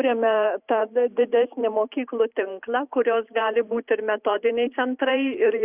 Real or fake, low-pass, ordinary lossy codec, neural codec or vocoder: fake; 3.6 kHz; Opus, 64 kbps; vocoder, 44.1 kHz, 128 mel bands every 512 samples, BigVGAN v2